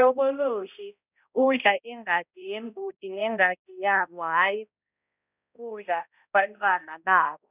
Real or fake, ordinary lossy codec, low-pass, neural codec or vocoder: fake; none; 3.6 kHz; codec, 16 kHz, 0.5 kbps, X-Codec, HuBERT features, trained on balanced general audio